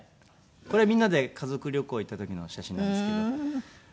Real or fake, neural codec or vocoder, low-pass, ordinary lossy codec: real; none; none; none